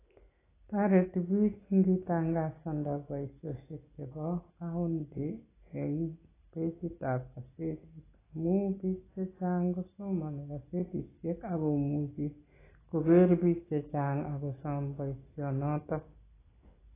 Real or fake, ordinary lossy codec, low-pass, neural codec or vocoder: real; AAC, 16 kbps; 3.6 kHz; none